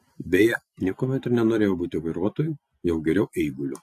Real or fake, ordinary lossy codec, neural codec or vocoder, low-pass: real; AAC, 64 kbps; none; 14.4 kHz